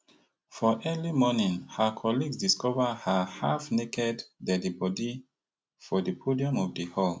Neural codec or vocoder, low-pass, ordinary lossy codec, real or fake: none; none; none; real